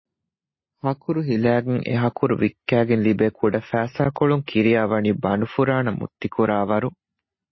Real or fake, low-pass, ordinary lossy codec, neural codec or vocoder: real; 7.2 kHz; MP3, 24 kbps; none